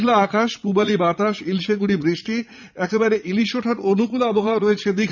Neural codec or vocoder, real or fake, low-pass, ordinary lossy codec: vocoder, 44.1 kHz, 80 mel bands, Vocos; fake; 7.2 kHz; none